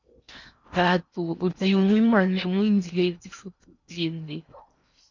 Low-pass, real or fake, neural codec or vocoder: 7.2 kHz; fake; codec, 16 kHz in and 24 kHz out, 0.8 kbps, FocalCodec, streaming, 65536 codes